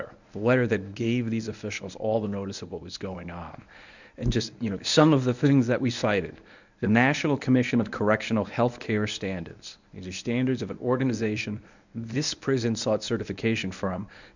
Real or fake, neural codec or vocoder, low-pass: fake; codec, 24 kHz, 0.9 kbps, WavTokenizer, medium speech release version 1; 7.2 kHz